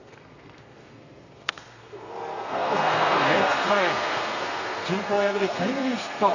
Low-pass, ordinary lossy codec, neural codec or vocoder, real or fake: 7.2 kHz; none; codec, 32 kHz, 1.9 kbps, SNAC; fake